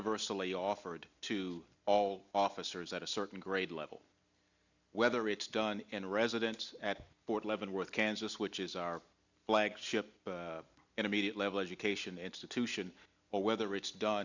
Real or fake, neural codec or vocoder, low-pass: real; none; 7.2 kHz